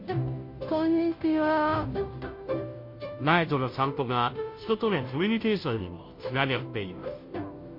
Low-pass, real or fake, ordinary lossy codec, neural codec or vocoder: 5.4 kHz; fake; MP3, 32 kbps; codec, 16 kHz, 0.5 kbps, FunCodec, trained on Chinese and English, 25 frames a second